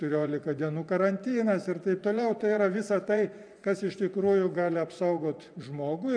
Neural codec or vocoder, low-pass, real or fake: vocoder, 24 kHz, 100 mel bands, Vocos; 9.9 kHz; fake